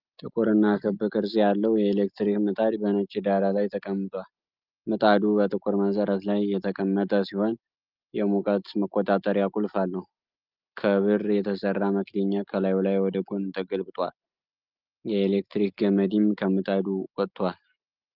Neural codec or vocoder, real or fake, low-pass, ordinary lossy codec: none; real; 5.4 kHz; Opus, 24 kbps